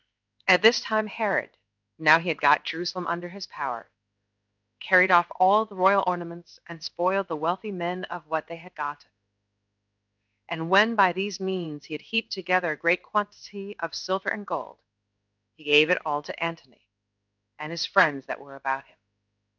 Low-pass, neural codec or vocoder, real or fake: 7.2 kHz; codec, 16 kHz in and 24 kHz out, 1 kbps, XY-Tokenizer; fake